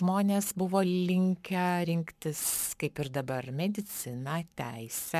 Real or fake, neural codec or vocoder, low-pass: fake; codec, 44.1 kHz, 7.8 kbps, Pupu-Codec; 14.4 kHz